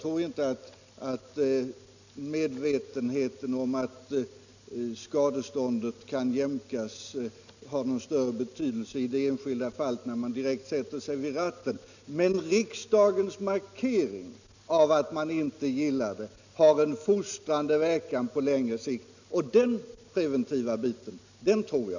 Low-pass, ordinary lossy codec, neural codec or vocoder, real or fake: 7.2 kHz; none; none; real